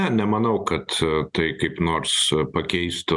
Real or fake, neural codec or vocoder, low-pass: real; none; 10.8 kHz